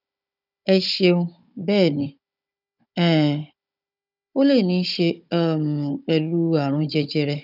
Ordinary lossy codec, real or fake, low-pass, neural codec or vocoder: none; fake; 5.4 kHz; codec, 16 kHz, 16 kbps, FunCodec, trained on Chinese and English, 50 frames a second